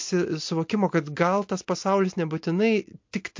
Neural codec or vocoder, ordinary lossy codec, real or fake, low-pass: none; MP3, 48 kbps; real; 7.2 kHz